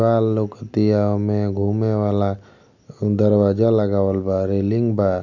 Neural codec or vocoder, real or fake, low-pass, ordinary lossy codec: none; real; 7.2 kHz; none